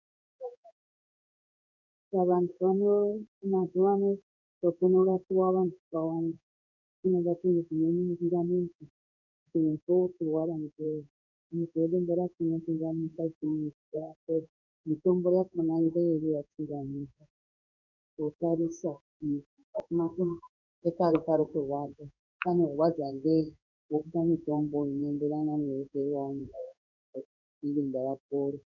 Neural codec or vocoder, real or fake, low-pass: codec, 16 kHz in and 24 kHz out, 1 kbps, XY-Tokenizer; fake; 7.2 kHz